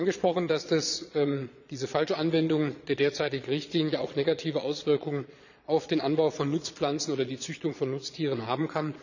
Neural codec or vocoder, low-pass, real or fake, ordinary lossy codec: vocoder, 22.05 kHz, 80 mel bands, Vocos; 7.2 kHz; fake; none